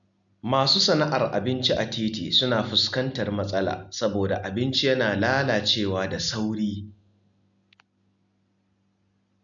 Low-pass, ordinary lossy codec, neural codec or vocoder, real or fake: 7.2 kHz; none; none; real